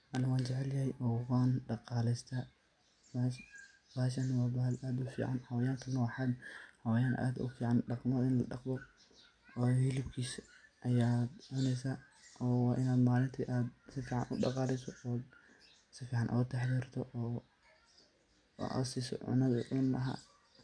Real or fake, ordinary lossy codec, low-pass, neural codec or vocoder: real; MP3, 96 kbps; 9.9 kHz; none